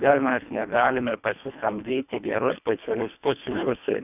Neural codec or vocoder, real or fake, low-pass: codec, 24 kHz, 1.5 kbps, HILCodec; fake; 3.6 kHz